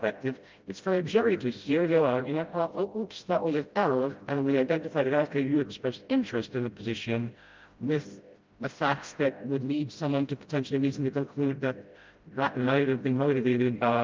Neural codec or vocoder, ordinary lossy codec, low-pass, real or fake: codec, 16 kHz, 0.5 kbps, FreqCodec, smaller model; Opus, 24 kbps; 7.2 kHz; fake